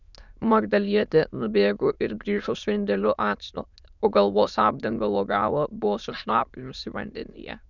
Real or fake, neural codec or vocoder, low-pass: fake; autoencoder, 22.05 kHz, a latent of 192 numbers a frame, VITS, trained on many speakers; 7.2 kHz